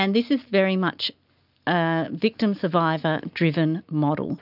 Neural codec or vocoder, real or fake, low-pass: none; real; 5.4 kHz